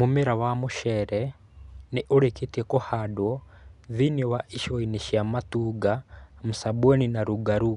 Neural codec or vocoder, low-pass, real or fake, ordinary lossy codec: none; 10.8 kHz; real; none